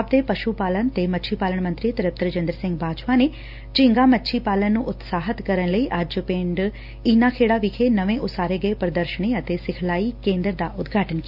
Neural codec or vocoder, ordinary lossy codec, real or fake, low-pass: none; none; real; 5.4 kHz